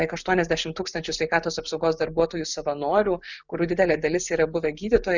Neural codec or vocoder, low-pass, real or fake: none; 7.2 kHz; real